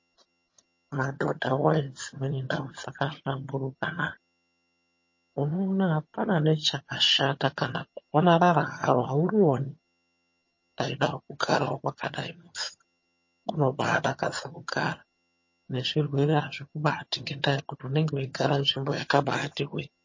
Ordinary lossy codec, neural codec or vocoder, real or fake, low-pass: MP3, 32 kbps; vocoder, 22.05 kHz, 80 mel bands, HiFi-GAN; fake; 7.2 kHz